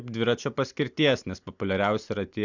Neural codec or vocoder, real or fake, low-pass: none; real; 7.2 kHz